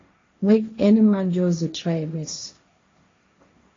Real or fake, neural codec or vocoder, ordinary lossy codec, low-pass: fake; codec, 16 kHz, 1.1 kbps, Voila-Tokenizer; AAC, 32 kbps; 7.2 kHz